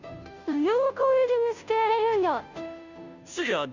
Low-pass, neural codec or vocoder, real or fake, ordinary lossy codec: 7.2 kHz; codec, 16 kHz, 0.5 kbps, FunCodec, trained on Chinese and English, 25 frames a second; fake; none